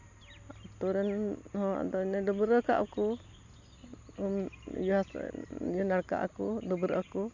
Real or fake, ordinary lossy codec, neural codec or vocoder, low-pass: real; AAC, 48 kbps; none; 7.2 kHz